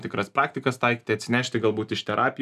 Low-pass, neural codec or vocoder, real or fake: 14.4 kHz; none; real